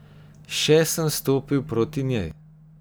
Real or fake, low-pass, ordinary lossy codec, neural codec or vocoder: real; none; none; none